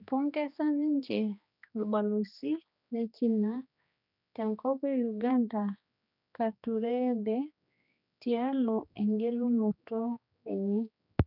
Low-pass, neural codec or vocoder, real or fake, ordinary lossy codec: 5.4 kHz; codec, 16 kHz, 2 kbps, X-Codec, HuBERT features, trained on general audio; fake; none